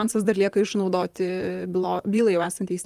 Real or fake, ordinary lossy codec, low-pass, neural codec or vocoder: fake; Opus, 32 kbps; 14.4 kHz; vocoder, 44.1 kHz, 128 mel bands, Pupu-Vocoder